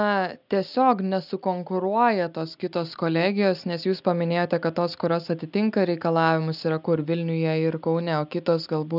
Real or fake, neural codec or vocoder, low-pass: real; none; 5.4 kHz